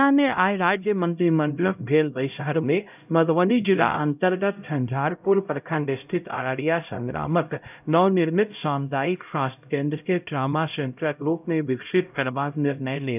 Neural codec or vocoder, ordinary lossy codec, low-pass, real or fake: codec, 16 kHz, 0.5 kbps, X-Codec, HuBERT features, trained on LibriSpeech; none; 3.6 kHz; fake